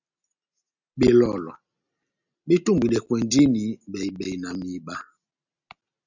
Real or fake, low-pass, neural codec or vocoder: real; 7.2 kHz; none